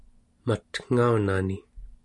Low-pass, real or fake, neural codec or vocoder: 10.8 kHz; real; none